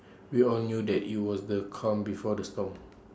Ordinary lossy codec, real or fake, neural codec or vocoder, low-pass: none; real; none; none